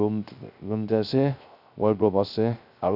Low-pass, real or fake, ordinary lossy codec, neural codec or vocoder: 5.4 kHz; fake; none; codec, 16 kHz, 0.3 kbps, FocalCodec